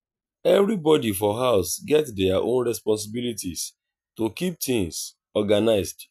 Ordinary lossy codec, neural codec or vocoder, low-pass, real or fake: none; none; 14.4 kHz; real